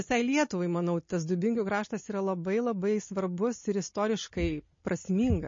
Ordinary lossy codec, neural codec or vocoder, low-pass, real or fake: MP3, 32 kbps; none; 7.2 kHz; real